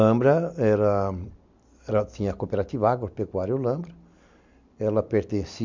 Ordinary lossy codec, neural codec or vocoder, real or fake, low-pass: MP3, 64 kbps; none; real; 7.2 kHz